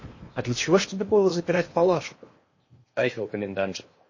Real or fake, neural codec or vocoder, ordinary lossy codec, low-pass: fake; codec, 16 kHz in and 24 kHz out, 0.8 kbps, FocalCodec, streaming, 65536 codes; MP3, 32 kbps; 7.2 kHz